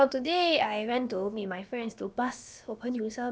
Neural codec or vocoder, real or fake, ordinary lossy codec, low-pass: codec, 16 kHz, about 1 kbps, DyCAST, with the encoder's durations; fake; none; none